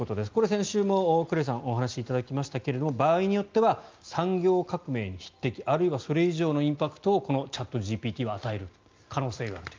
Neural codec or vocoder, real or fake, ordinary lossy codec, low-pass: none; real; Opus, 24 kbps; 7.2 kHz